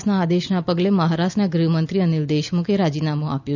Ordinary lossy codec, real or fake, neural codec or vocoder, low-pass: none; real; none; 7.2 kHz